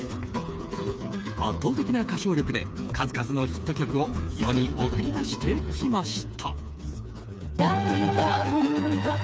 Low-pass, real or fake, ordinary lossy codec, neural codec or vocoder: none; fake; none; codec, 16 kHz, 4 kbps, FreqCodec, smaller model